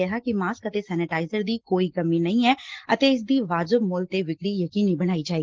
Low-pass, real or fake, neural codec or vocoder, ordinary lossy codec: 7.2 kHz; real; none; Opus, 16 kbps